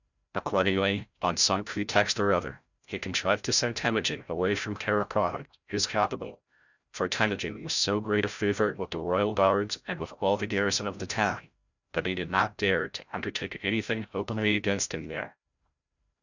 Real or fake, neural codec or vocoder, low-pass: fake; codec, 16 kHz, 0.5 kbps, FreqCodec, larger model; 7.2 kHz